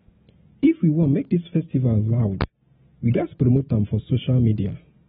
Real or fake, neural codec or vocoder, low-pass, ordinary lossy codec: real; none; 7.2 kHz; AAC, 16 kbps